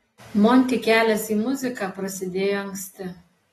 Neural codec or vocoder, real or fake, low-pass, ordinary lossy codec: none; real; 19.8 kHz; AAC, 32 kbps